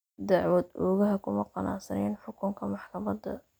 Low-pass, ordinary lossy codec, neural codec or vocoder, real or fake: none; none; none; real